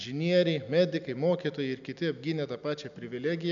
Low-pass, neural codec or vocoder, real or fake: 7.2 kHz; none; real